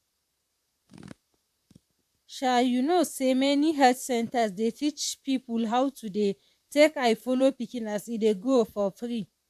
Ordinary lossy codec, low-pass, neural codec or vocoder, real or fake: AAC, 96 kbps; 14.4 kHz; vocoder, 44.1 kHz, 128 mel bands, Pupu-Vocoder; fake